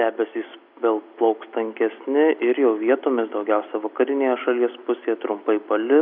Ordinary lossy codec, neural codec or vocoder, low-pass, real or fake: AAC, 48 kbps; none; 5.4 kHz; real